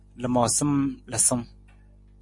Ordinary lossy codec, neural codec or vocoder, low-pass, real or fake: MP3, 48 kbps; none; 10.8 kHz; real